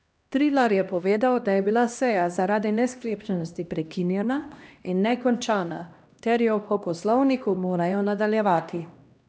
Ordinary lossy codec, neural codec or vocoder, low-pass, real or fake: none; codec, 16 kHz, 1 kbps, X-Codec, HuBERT features, trained on LibriSpeech; none; fake